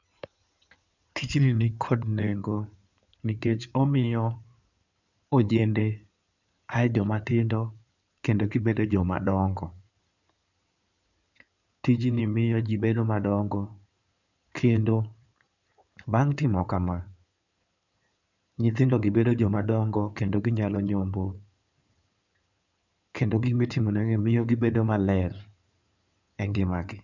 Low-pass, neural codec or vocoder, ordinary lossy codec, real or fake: 7.2 kHz; codec, 16 kHz in and 24 kHz out, 2.2 kbps, FireRedTTS-2 codec; none; fake